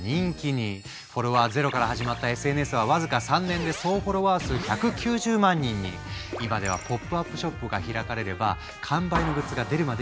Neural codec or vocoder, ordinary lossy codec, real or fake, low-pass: none; none; real; none